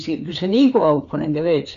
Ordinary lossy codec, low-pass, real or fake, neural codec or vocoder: none; 7.2 kHz; fake; codec, 16 kHz, 4 kbps, FreqCodec, larger model